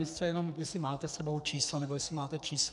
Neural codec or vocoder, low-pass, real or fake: codec, 44.1 kHz, 2.6 kbps, SNAC; 10.8 kHz; fake